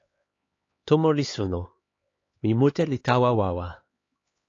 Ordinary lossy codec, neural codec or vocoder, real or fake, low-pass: AAC, 32 kbps; codec, 16 kHz, 4 kbps, X-Codec, HuBERT features, trained on LibriSpeech; fake; 7.2 kHz